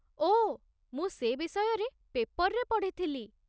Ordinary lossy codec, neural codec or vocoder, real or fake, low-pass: none; none; real; none